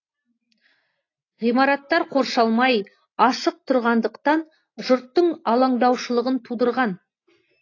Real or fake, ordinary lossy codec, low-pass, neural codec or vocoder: real; AAC, 32 kbps; 7.2 kHz; none